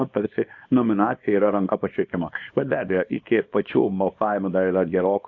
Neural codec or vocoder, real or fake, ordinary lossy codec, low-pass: codec, 24 kHz, 0.9 kbps, WavTokenizer, small release; fake; AAC, 48 kbps; 7.2 kHz